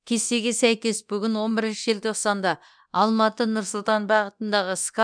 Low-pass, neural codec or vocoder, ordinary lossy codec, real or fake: 9.9 kHz; codec, 24 kHz, 0.9 kbps, DualCodec; none; fake